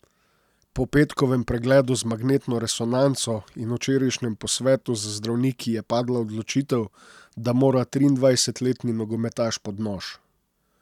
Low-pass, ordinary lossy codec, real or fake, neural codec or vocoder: 19.8 kHz; none; real; none